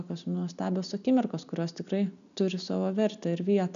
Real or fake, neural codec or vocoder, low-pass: real; none; 7.2 kHz